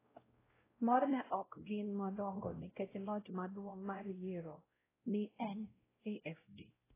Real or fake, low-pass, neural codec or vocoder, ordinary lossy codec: fake; 3.6 kHz; codec, 16 kHz, 0.5 kbps, X-Codec, WavLM features, trained on Multilingual LibriSpeech; AAC, 16 kbps